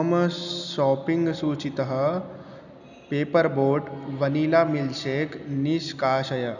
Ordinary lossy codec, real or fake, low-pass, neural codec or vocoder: none; real; 7.2 kHz; none